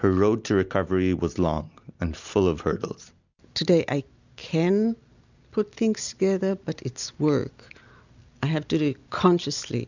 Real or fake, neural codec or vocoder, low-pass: real; none; 7.2 kHz